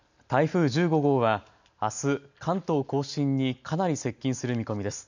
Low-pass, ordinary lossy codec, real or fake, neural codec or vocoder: 7.2 kHz; none; real; none